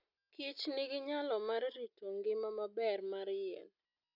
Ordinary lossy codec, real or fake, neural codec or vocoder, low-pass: none; real; none; 5.4 kHz